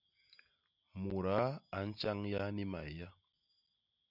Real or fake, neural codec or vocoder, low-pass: real; none; 5.4 kHz